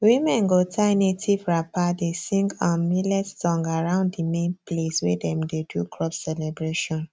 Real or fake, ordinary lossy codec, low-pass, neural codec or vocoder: real; none; none; none